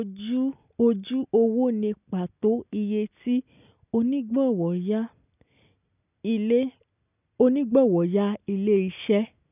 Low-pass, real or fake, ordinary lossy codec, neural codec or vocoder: 3.6 kHz; real; none; none